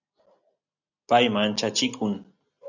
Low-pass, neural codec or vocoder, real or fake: 7.2 kHz; none; real